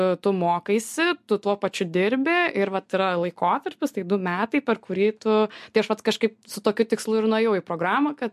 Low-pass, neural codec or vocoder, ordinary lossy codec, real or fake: 14.4 kHz; none; MP3, 64 kbps; real